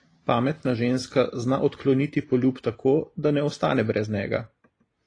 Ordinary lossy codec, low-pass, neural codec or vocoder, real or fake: AAC, 32 kbps; 9.9 kHz; none; real